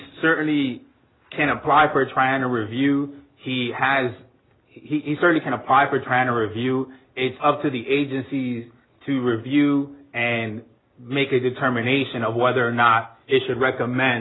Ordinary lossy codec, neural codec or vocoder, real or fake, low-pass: AAC, 16 kbps; none; real; 7.2 kHz